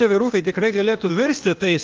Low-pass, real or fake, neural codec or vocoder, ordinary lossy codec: 7.2 kHz; fake; codec, 16 kHz, 0.8 kbps, ZipCodec; Opus, 24 kbps